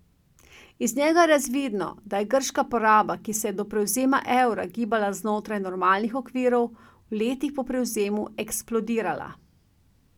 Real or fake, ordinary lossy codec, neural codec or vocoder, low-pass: real; none; none; 19.8 kHz